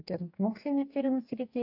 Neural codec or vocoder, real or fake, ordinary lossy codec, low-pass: codec, 44.1 kHz, 2.6 kbps, DAC; fake; AAC, 48 kbps; 5.4 kHz